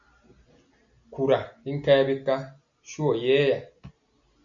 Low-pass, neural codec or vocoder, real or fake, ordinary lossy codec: 7.2 kHz; none; real; MP3, 96 kbps